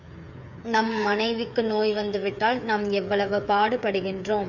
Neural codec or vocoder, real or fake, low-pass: codec, 16 kHz, 8 kbps, FreqCodec, smaller model; fake; 7.2 kHz